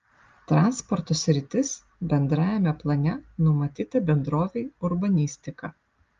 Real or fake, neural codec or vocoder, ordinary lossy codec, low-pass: real; none; Opus, 32 kbps; 7.2 kHz